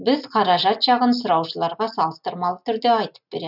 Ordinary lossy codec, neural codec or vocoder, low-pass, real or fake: none; none; 5.4 kHz; real